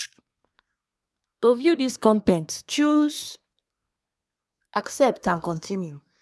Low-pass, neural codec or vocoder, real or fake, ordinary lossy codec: none; codec, 24 kHz, 1 kbps, SNAC; fake; none